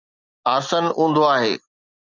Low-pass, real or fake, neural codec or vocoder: 7.2 kHz; real; none